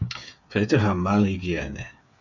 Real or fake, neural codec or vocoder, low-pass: fake; codec, 16 kHz, 8 kbps, FreqCodec, smaller model; 7.2 kHz